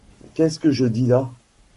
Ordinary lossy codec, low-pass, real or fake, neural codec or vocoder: MP3, 48 kbps; 14.4 kHz; fake; codec, 44.1 kHz, 7.8 kbps, Pupu-Codec